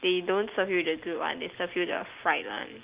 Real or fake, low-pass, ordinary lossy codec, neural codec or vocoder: real; 3.6 kHz; Opus, 32 kbps; none